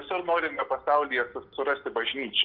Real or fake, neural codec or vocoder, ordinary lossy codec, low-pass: real; none; Opus, 24 kbps; 5.4 kHz